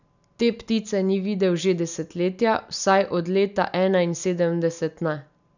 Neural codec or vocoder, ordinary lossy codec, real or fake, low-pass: none; none; real; 7.2 kHz